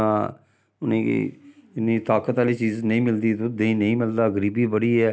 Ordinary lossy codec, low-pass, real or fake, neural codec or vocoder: none; none; real; none